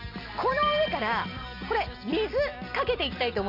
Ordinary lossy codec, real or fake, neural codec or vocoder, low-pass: none; real; none; 5.4 kHz